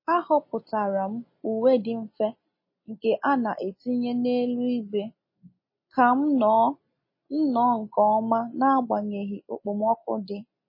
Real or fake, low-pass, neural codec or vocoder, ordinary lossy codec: real; 5.4 kHz; none; MP3, 24 kbps